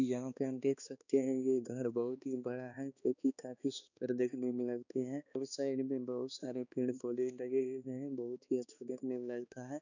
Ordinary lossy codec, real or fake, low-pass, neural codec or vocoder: none; fake; 7.2 kHz; codec, 16 kHz, 2 kbps, X-Codec, HuBERT features, trained on balanced general audio